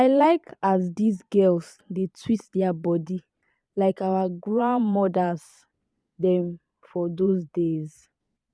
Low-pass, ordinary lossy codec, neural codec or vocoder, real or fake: none; none; vocoder, 22.05 kHz, 80 mel bands, Vocos; fake